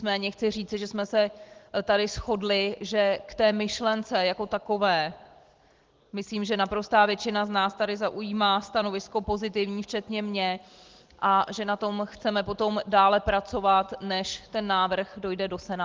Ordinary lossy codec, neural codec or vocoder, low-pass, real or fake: Opus, 16 kbps; none; 7.2 kHz; real